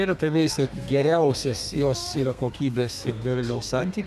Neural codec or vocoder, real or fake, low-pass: codec, 32 kHz, 1.9 kbps, SNAC; fake; 14.4 kHz